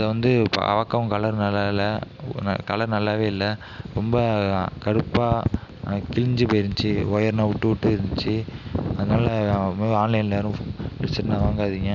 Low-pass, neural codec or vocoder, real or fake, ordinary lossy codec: 7.2 kHz; none; real; none